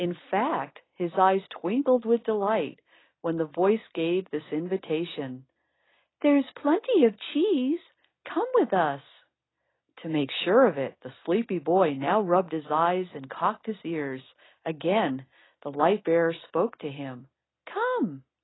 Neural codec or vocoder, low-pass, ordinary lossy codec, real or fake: none; 7.2 kHz; AAC, 16 kbps; real